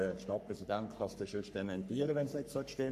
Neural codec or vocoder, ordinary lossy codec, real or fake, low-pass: codec, 44.1 kHz, 3.4 kbps, Pupu-Codec; none; fake; 14.4 kHz